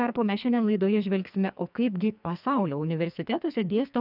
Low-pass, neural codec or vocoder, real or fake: 5.4 kHz; codec, 44.1 kHz, 2.6 kbps, SNAC; fake